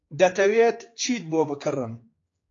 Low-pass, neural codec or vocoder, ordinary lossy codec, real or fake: 7.2 kHz; codec, 16 kHz, 4 kbps, X-Codec, HuBERT features, trained on general audio; AAC, 32 kbps; fake